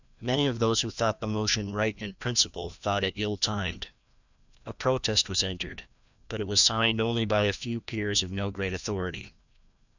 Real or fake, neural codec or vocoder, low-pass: fake; codec, 16 kHz, 1 kbps, FreqCodec, larger model; 7.2 kHz